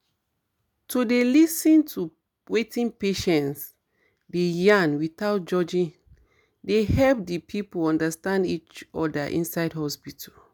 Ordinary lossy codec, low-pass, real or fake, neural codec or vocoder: none; none; real; none